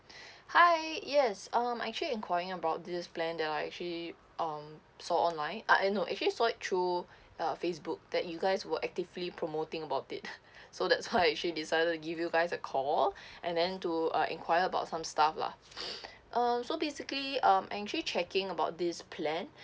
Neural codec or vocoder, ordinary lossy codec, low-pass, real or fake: none; none; none; real